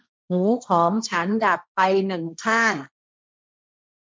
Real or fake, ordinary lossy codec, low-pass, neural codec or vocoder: fake; none; none; codec, 16 kHz, 1.1 kbps, Voila-Tokenizer